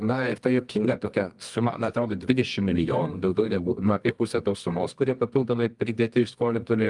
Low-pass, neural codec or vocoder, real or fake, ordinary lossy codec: 10.8 kHz; codec, 24 kHz, 0.9 kbps, WavTokenizer, medium music audio release; fake; Opus, 32 kbps